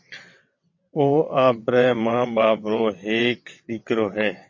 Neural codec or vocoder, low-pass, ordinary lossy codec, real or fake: vocoder, 22.05 kHz, 80 mel bands, WaveNeXt; 7.2 kHz; MP3, 32 kbps; fake